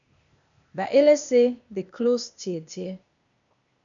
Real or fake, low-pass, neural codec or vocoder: fake; 7.2 kHz; codec, 16 kHz, 0.8 kbps, ZipCodec